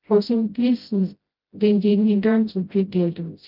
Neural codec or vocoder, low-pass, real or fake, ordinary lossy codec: codec, 16 kHz, 0.5 kbps, FreqCodec, smaller model; 5.4 kHz; fake; Opus, 24 kbps